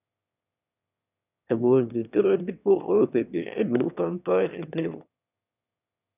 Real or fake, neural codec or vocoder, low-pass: fake; autoencoder, 22.05 kHz, a latent of 192 numbers a frame, VITS, trained on one speaker; 3.6 kHz